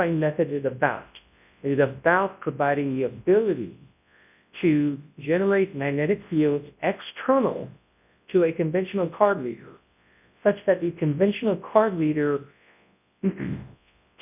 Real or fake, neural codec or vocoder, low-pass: fake; codec, 24 kHz, 0.9 kbps, WavTokenizer, large speech release; 3.6 kHz